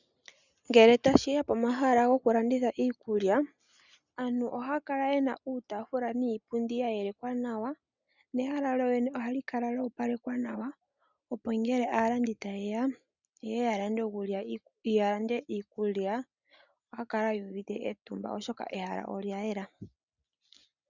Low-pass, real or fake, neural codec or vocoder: 7.2 kHz; real; none